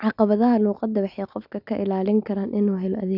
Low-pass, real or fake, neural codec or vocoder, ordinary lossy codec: 5.4 kHz; real; none; none